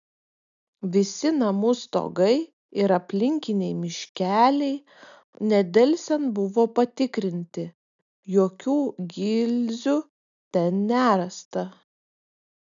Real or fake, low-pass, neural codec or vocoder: real; 7.2 kHz; none